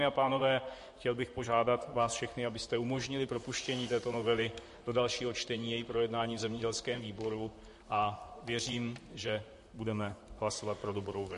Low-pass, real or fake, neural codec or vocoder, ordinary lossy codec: 14.4 kHz; fake; vocoder, 44.1 kHz, 128 mel bands, Pupu-Vocoder; MP3, 48 kbps